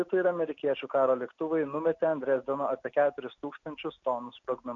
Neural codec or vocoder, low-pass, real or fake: none; 7.2 kHz; real